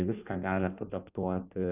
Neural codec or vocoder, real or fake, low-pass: codec, 16 kHz in and 24 kHz out, 1.1 kbps, FireRedTTS-2 codec; fake; 3.6 kHz